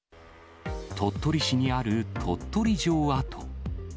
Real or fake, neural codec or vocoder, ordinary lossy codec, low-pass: real; none; none; none